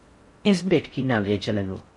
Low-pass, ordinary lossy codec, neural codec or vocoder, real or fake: 10.8 kHz; MP3, 48 kbps; codec, 16 kHz in and 24 kHz out, 0.6 kbps, FocalCodec, streaming, 4096 codes; fake